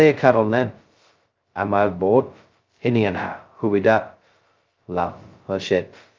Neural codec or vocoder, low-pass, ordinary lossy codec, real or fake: codec, 16 kHz, 0.2 kbps, FocalCodec; 7.2 kHz; Opus, 32 kbps; fake